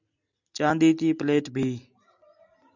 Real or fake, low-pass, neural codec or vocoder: real; 7.2 kHz; none